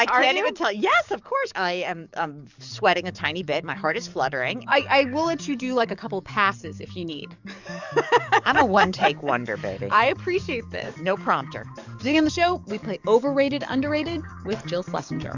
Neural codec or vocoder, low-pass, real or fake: codec, 44.1 kHz, 7.8 kbps, DAC; 7.2 kHz; fake